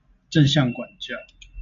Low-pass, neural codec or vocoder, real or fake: 7.2 kHz; none; real